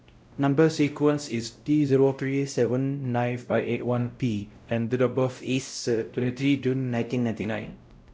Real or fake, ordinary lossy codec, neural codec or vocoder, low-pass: fake; none; codec, 16 kHz, 0.5 kbps, X-Codec, WavLM features, trained on Multilingual LibriSpeech; none